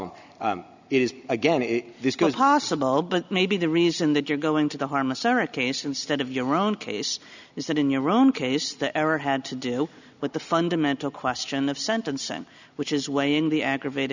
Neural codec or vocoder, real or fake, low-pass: none; real; 7.2 kHz